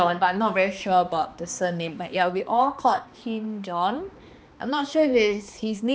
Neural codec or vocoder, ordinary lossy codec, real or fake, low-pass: codec, 16 kHz, 2 kbps, X-Codec, HuBERT features, trained on balanced general audio; none; fake; none